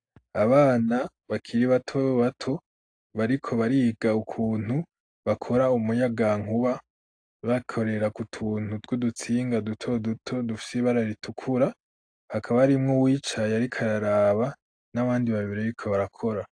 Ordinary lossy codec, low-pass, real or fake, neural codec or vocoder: AAC, 48 kbps; 9.9 kHz; real; none